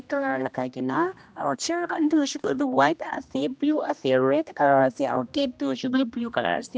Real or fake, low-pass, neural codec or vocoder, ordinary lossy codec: fake; none; codec, 16 kHz, 1 kbps, X-Codec, HuBERT features, trained on general audio; none